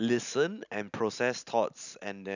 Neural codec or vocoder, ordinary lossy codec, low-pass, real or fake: none; none; 7.2 kHz; real